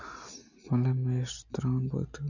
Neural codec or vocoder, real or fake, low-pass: none; real; 7.2 kHz